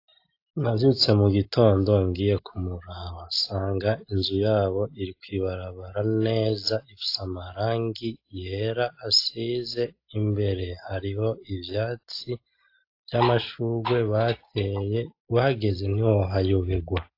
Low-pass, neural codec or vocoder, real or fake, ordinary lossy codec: 5.4 kHz; none; real; AAC, 32 kbps